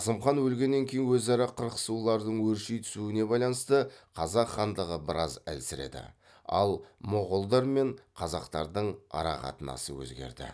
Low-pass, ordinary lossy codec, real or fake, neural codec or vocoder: none; none; real; none